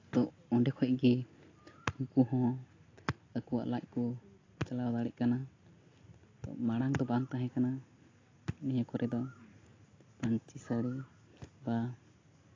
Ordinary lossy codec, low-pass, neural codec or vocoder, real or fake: AAC, 32 kbps; 7.2 kHz; none; real